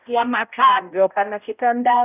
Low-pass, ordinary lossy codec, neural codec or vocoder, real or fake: 3.6 kHz; none; codec, 16 kHz, 0.5 kbps, X-Codec, HuBERT features, trained on balanced general audio; fake